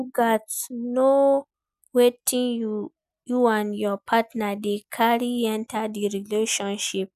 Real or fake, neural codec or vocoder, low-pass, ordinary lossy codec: real; none; 14.4 kHz; none